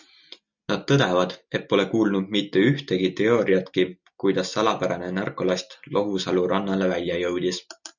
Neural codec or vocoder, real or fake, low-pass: none; real; 7.2 kHz